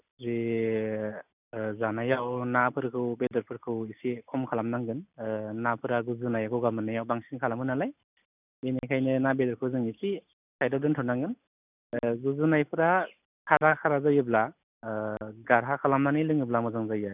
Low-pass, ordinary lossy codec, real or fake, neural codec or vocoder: 3.6 kHz; none; real; none